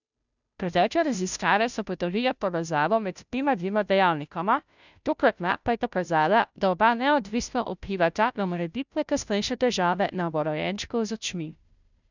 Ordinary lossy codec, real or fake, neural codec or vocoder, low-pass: none; fake; codec, 16 kHz, 0.5 kbps, FunCodec, trained on Chinese and English, 25 frames a second; 7.2 kHz